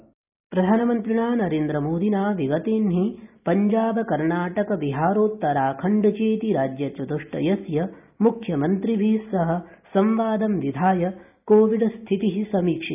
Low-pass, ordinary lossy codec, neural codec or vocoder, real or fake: 3.6 kHz; none; none; real